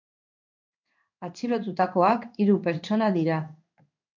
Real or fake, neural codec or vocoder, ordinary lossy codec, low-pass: fake; codec, 16 kHz in and 24 kHz out, 1 kbps, XY-Tokenizer; MP3, 64 kbps; 7.2 kHz